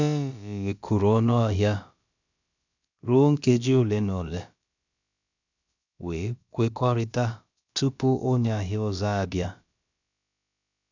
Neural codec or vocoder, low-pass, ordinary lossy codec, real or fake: codec, 16 kHz, about 1 kbps, DyCAST, with the encoder's durations; 7.2 kHz; none; fake